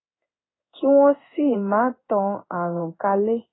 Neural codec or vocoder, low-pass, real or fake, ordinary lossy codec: codec, 16 kHz in and 24 kHz out, 1 kbps, XY-Tokenizer; 7.2 kHz; fake; AAC, 16 kbps